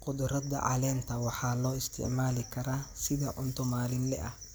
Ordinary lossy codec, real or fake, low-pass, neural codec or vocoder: none; real; none; none